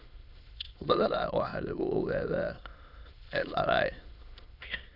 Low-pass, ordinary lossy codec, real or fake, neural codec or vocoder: 5.4 kHz; AAC, 48 kbps; fake; autoencoder, 22.05 kHz, a latent of 192 numbers a frame, VITS, trained on many speakers